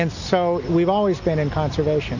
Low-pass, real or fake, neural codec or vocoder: 7.2 kHz; real; none